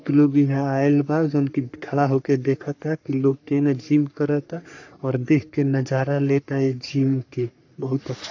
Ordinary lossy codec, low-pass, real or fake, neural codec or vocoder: AAC, 48 kbps; 7.2 kHz; fake; codec, 44.1 kHz, 3.4 kbps, Pupu-Codec